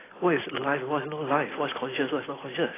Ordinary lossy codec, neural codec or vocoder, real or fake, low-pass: AAC, 16 kbps; none; real; 3.6 kHz